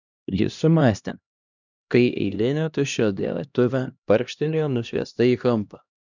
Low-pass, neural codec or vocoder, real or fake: 7.2 kHz; codec, 16 kHz, 1 kbps, X-Codec, HuBERT features, trained on LibriSpeech; fake